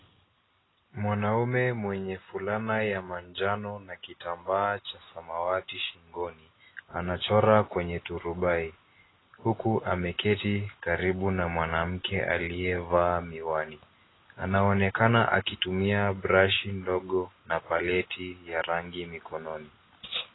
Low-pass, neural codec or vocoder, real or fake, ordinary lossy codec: 7.2 kHz; none; real; AAC, 16 kbps